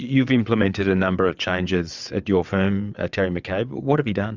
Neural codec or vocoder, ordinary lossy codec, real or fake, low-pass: vocoder, 22.05 kHz, 80 mel bands, WaveNeXt; Opus, 64 kbps; fake; 7.2 kHz